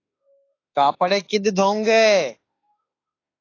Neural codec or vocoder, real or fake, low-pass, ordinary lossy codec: autoencoder, 48 kHz, 32 numbers a frame, DAC-VAE, trained on Japanese speech; fake; 7.2 kHz; AAC, 32 kbps